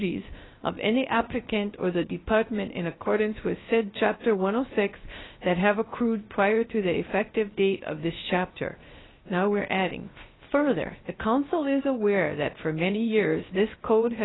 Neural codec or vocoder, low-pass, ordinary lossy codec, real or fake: codec, 16 kHz, 0.3 kbps, FocalCodec; 7.2 kHz; AAC, 16 kbps; fake